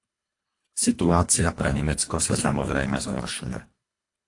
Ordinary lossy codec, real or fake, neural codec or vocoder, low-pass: AAC, 48 kbps; fake; codec, 24 kHz, 1.5 kbps, HILCodec; 10.8 kHz